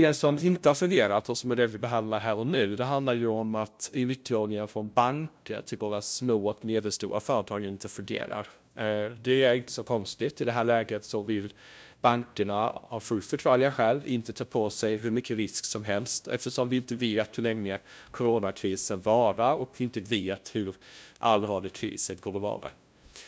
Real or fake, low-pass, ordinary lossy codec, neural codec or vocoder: fake; none; none; codec, 16 kHz, 0.5 kbps, FunCodec, trained on LibriTTS, 25 frames a second